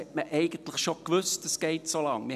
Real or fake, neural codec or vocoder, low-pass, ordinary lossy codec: real; none; 14.4 kHz; none